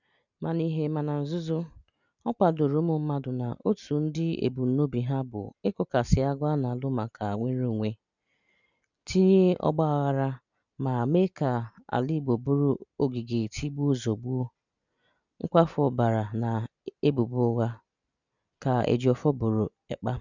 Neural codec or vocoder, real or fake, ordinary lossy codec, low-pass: none; real; none; 7.2 kHz